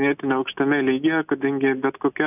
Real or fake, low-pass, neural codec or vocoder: real; 3.6 kHz; none